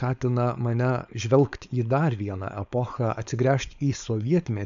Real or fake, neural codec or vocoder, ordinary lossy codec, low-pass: fake; codec, 16 kHz, 4.8 kbps, FACodec; AAC, 96 kbps; 7.2 kHz